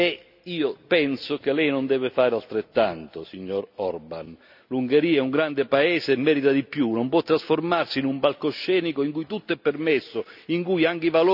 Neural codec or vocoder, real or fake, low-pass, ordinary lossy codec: none; real; 5.4 kHz; none